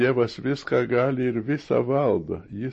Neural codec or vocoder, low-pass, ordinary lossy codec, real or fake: none; 10.8 kHz; MP3, 32 kbps; real